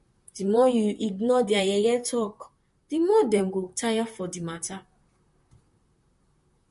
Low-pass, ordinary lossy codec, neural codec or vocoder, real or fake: 14.4 kHz; MP3, 48 kbps; vocoder, 44.1 kHz, 128 mel bands, Pupu-Vocoder; fake